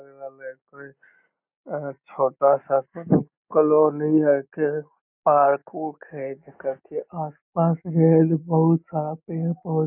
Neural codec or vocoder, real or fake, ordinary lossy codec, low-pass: none; real; none; 3.6 kHz